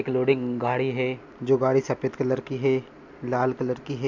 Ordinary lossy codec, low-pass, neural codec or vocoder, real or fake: MP3, 64 kbps; 7.2 kHz; none; real